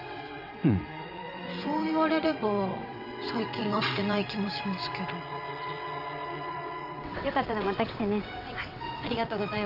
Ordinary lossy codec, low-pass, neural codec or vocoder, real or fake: none; 5.4 kHz; vocoder, 22.05 kHz, 80 mel bands, WaveNeXt; fake